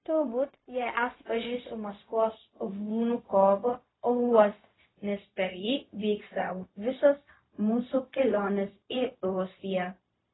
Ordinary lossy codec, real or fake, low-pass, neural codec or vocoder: AAC, 16 kbps; fake; 7.2 kHz; codec, 16 kHz, 0.4 kbps, LongCat-Audio-Codec